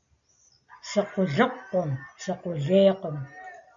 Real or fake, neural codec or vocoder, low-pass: real; none; 7.2 kHz